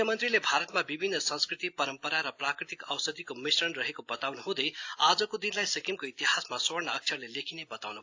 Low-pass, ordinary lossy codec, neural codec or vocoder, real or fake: 7.2 kHz; AAC, 48 kbps; none; real